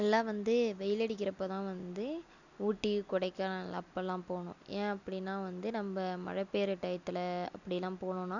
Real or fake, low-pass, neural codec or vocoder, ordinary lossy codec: real; 7.2 kHz; none; none